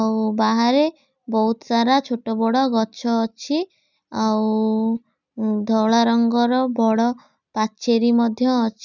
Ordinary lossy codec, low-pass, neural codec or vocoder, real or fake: none; 7.2 kHz; none; real